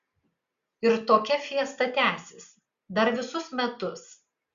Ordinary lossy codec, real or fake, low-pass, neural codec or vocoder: Opus, 64 kbps; real; 7.2 kHz; none